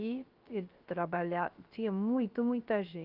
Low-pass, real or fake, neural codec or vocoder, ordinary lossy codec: 5.4 kHz; fake; codec, 16 kHz, 0.3 kbps, FocalCodec; Opus, 32 kbps